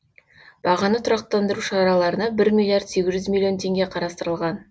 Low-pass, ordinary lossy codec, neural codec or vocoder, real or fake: none; none; none; real